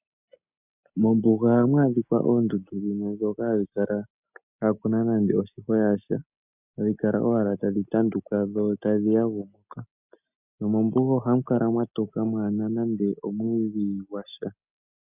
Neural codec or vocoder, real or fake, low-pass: none; real; 3.6 kHz